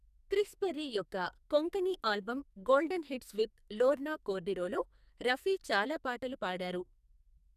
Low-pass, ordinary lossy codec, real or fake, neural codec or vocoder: 14.4 kHz; none; fake; codec, 44.1 kHz, 2.6 kbps, SNAC